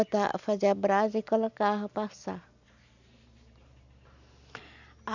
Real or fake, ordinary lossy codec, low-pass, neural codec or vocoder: real; none; 7.2 kHz; none